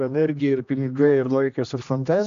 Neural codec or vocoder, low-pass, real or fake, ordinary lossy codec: codec, 16 kHz, 1 kbps, X-Codec, HuBERT features, trained on general audio; 7.2 kHz; fake; AAC, 96 kbps